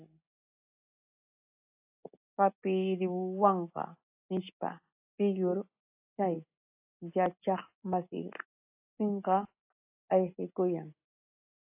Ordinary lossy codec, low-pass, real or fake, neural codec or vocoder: AAC, 24 kbps; 3.6 kHz; real; none